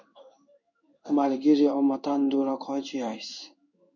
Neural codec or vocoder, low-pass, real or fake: codec, 16 kHz in and 24 kHz out, 1 kbps, XY-Tokenizer; 7.2 kHz; fake